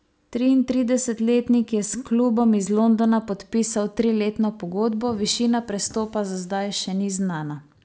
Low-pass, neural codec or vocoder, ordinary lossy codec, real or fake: none; none; none; real